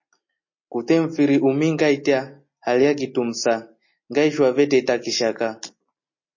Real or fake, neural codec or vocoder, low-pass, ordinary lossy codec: real; none; 7.2 kHz; MP3, 32 kbps